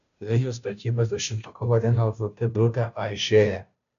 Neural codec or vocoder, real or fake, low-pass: codec, 16 kHz, 0.5 kbps, FunCodec, trained on Chinese and English, 25 frames a second; fake; 7.2 kHz